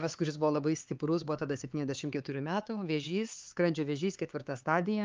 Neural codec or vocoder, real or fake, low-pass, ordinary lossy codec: codec, 16 kHz, 4 kbps, X-Codec, HuBERT features, trained on LibriSpeech; fake; 7.2 kHz; Opus, 24 kbps